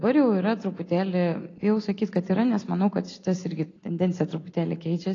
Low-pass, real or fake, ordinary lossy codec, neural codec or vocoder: 7.2 kHz; real; AAC, 32 kbps; none